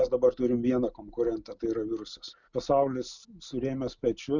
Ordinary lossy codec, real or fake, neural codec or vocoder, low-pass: Opus, 64 kbps; real; none; 7.2 kHz